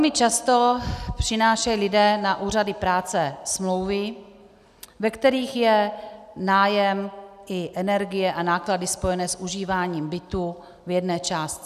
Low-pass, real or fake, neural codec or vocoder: 14.4 kHz; real; none